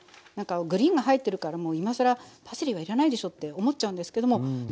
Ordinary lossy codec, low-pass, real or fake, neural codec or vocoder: none; none; real; none